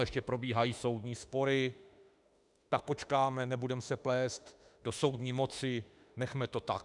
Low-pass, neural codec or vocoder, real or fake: 10.8 kHz; autoencoder, 48 kHz, 32 numbers a frame, DAC-VAE, trained on Japanese speech; fake